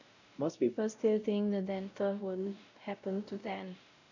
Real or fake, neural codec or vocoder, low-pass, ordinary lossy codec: fake; codec, 16 kHz, 0.5 kbps, X-Codec, WavLM features, trained on Multilingual LibriSpeech; 7.2 kHz; none